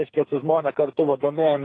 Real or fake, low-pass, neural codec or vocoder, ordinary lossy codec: fake; 9.9 kHz; codec, 44.1 kHz, 2.6 kbps, SNAC; AAC, 32 kbps